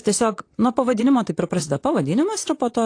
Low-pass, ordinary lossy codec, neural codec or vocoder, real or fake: 9.9 kHz; AAC, 48 kbps; vocoder, 44.1 kHz, 128 mel bands, Pupu-Vocoder; fake